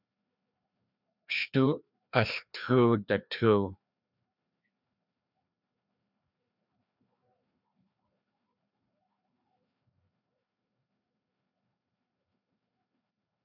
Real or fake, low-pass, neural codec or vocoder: fake; 5.4 kHz; codec, 16 kHz, 2 kbps, FreqCodec, larger model